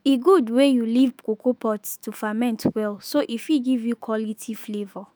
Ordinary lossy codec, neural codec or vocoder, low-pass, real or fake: none; autoencoder, 48 kHz, 128 numbers a frame, DAC-VAE, trained on Japanese speech; none; fake